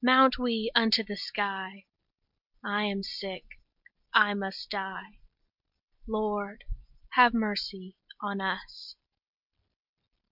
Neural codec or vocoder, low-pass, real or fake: none; 5.4 kHz; real